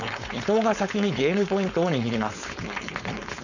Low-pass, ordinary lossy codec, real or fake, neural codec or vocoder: 7.2 kHz; none; fake; codec, 16 kHz, 4.8 kbps, FACodec